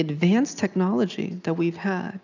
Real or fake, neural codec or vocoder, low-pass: real; none; 7.2 kHz